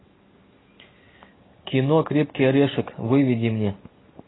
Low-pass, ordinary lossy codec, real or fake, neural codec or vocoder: 7.2 kHz; AAC, 16 kbps; real; none